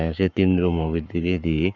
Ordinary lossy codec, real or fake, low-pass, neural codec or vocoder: none; fake; 7.2 kHz; codec, 44.1 kHz, 7.8 kbps, Pupu-Codec